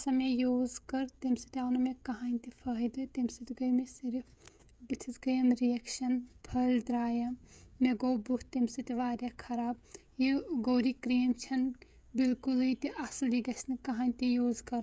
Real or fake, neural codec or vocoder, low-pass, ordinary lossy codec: fake; codec, 16 kHz, 16 kbps, FreqCodec, smaller model; none; none